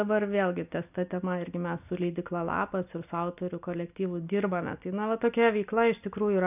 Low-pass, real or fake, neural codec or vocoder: 3.6 kHz; real; none